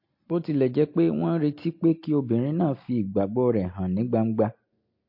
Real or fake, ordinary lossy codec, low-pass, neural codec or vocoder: real; MP3, 32 kbps; 5.4 kHz; none